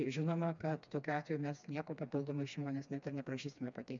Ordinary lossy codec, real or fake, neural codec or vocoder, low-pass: MP3, 64 kbps; fake; codec, 16 kHz, 2 kbps, FreqCodec, smaller model; 7.2 kHz